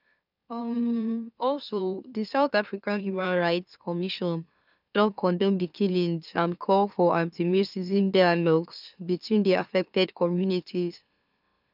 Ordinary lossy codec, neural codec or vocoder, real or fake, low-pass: none; autoencoder, 44.1 kHz, a latent of 192 numbers a frame, MeloTTS; fake; 5.4 kHz